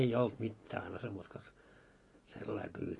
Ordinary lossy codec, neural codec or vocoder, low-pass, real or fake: AAC, 32 kbps; none; 10.8 kHz; real